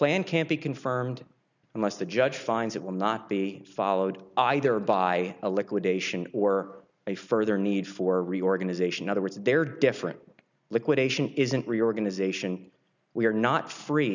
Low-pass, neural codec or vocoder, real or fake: 7.2 kHz; none; real